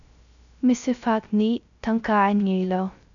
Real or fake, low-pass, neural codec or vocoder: fake; 7.2 kHz; codec, 16 kHz, 0.3 kbps, FocalCodec